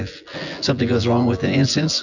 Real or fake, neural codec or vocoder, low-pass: fake; vocoder, 24 kHz, 100 mel bands, Vocos; 7.2 kHz